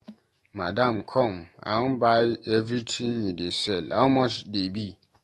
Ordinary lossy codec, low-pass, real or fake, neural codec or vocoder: AAC, 32 kbps; 19.8 kHz; fake; autoencoder, 48 kHz, 128 numbers a frame, DAC-VAE, trained on Japanese speech